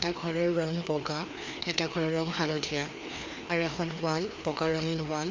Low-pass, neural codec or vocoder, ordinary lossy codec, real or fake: 7.2 kHz; codec, 16 kHz, 2 kbps, FreqCodec, larger model; MP3, 64 kbps; fake